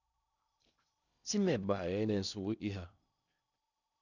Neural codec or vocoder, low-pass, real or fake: codec, 16 kHz in and 24 kHz out, 0.8 kbps, FocalCodec, streaming, 65536 codes; 7.2 kHz; fake